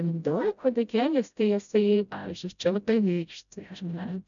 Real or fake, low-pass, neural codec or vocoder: fake; 7.2 kHz; codec, 16 kHz, 0.5 kbps, FreqCodec, smaller model